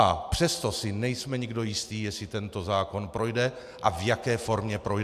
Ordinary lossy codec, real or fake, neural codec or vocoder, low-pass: AAC, 96 kbps; real; none; 14.4 kHz